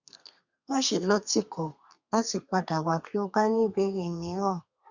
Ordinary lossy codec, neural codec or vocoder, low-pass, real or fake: Opus, 64 kbps; codec, 32 kHz, 1.9 kbps, SNAC; 7.2 kHz; fake